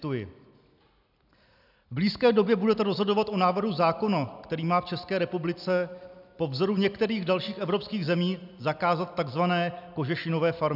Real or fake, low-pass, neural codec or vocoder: real; 5.4 kHz; none